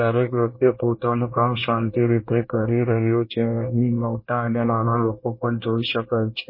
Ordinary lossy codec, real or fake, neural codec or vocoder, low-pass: MP3, 24 kbps; fake; codec, 24 kHz, 1 kbps, SNAC; 5.4 kHz